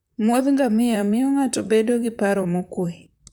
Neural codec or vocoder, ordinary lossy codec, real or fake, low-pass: vocoder, 44.1 kHz, 128 mel bands, Pupu-Vocoder; none; fake; none